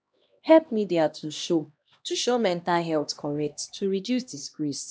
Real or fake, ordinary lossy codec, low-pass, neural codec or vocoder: fake; none; none; codec, 16 kHz, 1 kbps, X-Codec, HuBERT features, trained on LibriSpeech